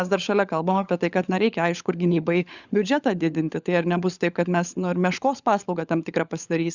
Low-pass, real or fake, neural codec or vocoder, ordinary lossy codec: 7.2 kHz; fake; codec, 16 kHz, 16 kbps, FunCodec, trained on LibriTTS, 50 frames a second; Opus, 64 kbps